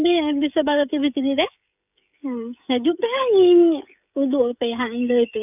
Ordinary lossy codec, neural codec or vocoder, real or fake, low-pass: none; codec, 16 kHz, 16 kbps, FreqCodec, smaller model; fake; 3.6 kHz